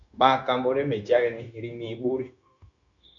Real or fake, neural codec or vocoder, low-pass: fake; codec, 16 kHz, 0.9 kbps, LongCat-Audio-Codec; 7.2 kHz